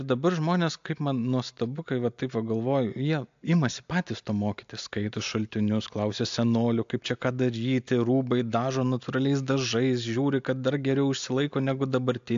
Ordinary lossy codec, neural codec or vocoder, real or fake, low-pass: AAC, 64 kbps; none; real; 7.2 kHz